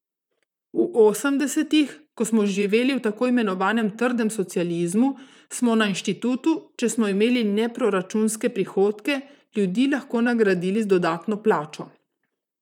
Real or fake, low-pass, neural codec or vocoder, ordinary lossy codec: fake; 19.8 kHz; vocoder, 44.1 kHz, 128 mel bands, Pupu-Vocoder; none